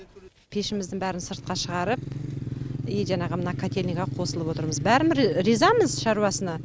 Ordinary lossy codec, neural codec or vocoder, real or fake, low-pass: none; none; real; none